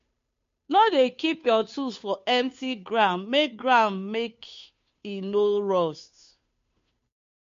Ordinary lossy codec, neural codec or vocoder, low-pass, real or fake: MP3, 48 kbps; codec, 16 kHz, 2 kbps, FunCodec, trained on Chinese and English, 25 frames a second; 7.2 kHz; fake